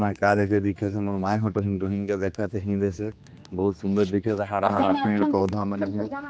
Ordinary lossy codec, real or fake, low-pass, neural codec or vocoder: none; fake; none; codec, 16 kHz, 2 kbps, X-Codec, HuBERT features, trained on general audio